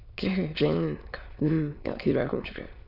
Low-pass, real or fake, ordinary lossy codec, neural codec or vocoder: 5.4 kHz; fake; none; autoencoder, 22.05 kHz, a latent of 192 numbers a frame, VITS, trained on many speakers